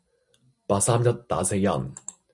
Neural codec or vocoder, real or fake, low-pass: none; real; 10.8 kHz